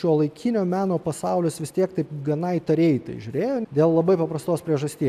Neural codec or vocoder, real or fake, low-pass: none; real; 14.4 kHz